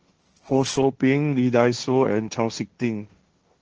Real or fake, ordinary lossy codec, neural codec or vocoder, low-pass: fake; Opus, 16 kbps; codec, 16 kHz, 1.1 kbps, Voila-Tokenizer; 7.2 kHz